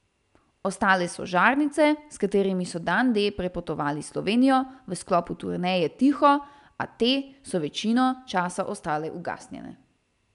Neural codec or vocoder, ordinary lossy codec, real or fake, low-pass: none; none; real; 10.8 kHz